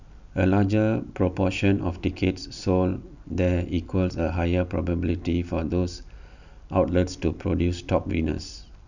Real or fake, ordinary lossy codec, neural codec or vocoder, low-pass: real; none; none; 7.2 kHz